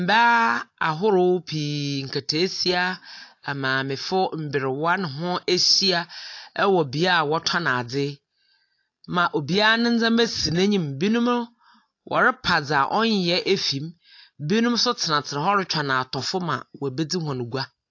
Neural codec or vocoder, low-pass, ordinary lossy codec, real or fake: none; 7.2 kHz; AAC, 48 kbps; real